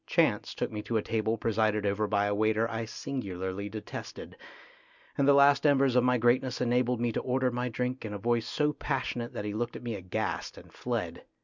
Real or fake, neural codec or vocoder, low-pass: real; none; 7.2 kHz